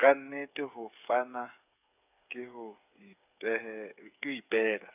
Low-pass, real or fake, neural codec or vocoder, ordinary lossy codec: 3.6 kHz; fake; codec, 16 kHz, 8 kbps, FreqCodec, smaller model; none